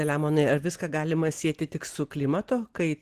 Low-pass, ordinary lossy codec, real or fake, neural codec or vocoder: 14.4 kHz; Opus, 16 kbps; real; none